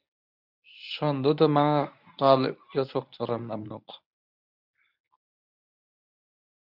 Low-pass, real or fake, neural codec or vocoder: 5.4 kHz; fake; codec, 24 kHz, 0.9 kbps, WavTokenizer, medium speech release version 1